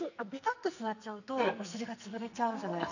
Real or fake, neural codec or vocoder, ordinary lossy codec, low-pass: fake; codec, 44.1 kHz, 2.6 kbps, SNAC; none; 7.2 kHz